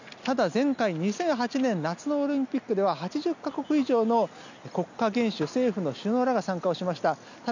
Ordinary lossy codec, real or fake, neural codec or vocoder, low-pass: none; real; none; 7.2 kHz